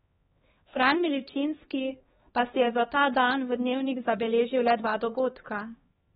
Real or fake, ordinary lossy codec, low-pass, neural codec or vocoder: fake; AAC, 16 kbps; 7.2 kHz; codec, 16 kHz, 2 kbps, X-Codec, HuBERT features, trained on LibriSpeech